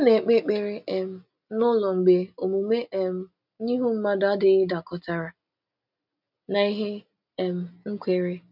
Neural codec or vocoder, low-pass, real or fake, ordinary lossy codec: none; 5.4 kHz; real; none